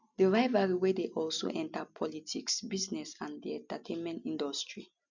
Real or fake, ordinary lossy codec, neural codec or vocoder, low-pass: real; none; none; 7.2 kHz